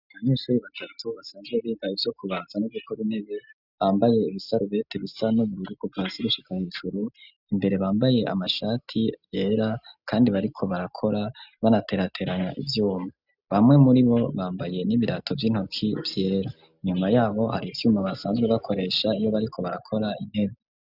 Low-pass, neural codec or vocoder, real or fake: 5.4 kHz; none; real